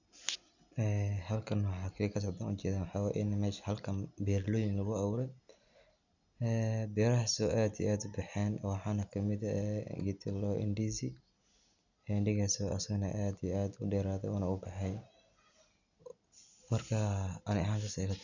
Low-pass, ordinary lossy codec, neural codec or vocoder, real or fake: 7.2 kHz; none; none; real